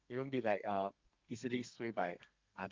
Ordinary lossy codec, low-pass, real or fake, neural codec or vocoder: Opus, 24 kbps; 7.2 kHz; fake; codec, 32 kHz, 1.9 kbps, SNAC